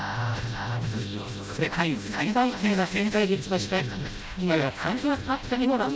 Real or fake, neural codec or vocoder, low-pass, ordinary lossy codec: fake; codec, 16 kHz, 0.5 kbps, FreqCodec, smaller model; none; none